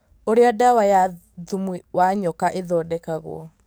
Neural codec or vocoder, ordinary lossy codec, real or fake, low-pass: codec, 44.1 kHz, 7.8 kbps, DAC; none; fake; none